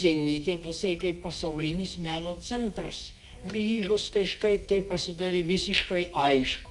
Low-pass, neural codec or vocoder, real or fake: 10.8 kHz; codec, 24 kHz, 0.9 kbps, WavTokenizer, medium music audio release; fake